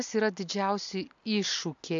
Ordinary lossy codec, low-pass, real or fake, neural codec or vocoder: AAC, 64 kbps; 7.2 kHz; real; none